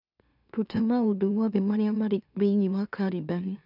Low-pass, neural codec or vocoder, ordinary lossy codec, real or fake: 5.4 kHz; autoencoder, 44.1 kHz, a latent of 192 numbers a frame, MeloTTS; none; fake